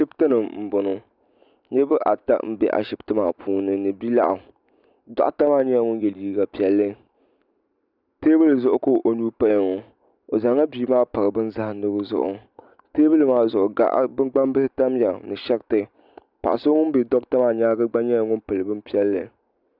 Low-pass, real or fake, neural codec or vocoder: 5.4 kHz; real; none